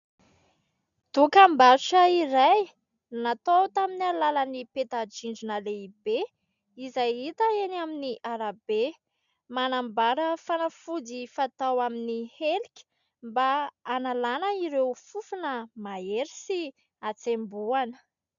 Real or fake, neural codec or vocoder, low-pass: real; none; 7.2 kHz